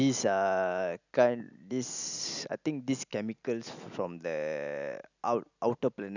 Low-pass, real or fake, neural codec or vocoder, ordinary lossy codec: 7.2 kHz; real; none; none